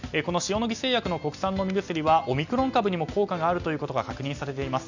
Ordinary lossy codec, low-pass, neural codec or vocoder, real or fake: none; 7.2 kHz; none; real